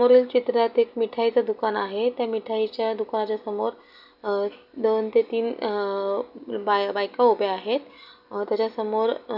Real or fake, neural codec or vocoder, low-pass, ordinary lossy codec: real; none; 5.4 kHz; none